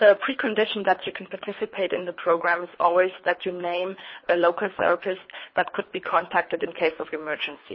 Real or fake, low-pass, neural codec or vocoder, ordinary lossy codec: fake; 7.2 kHz; codec, 24 kHz, 3 kbps, HILCodec; MP3, 24 kbps